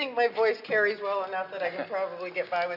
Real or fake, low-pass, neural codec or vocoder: real; 5.4 kHz; none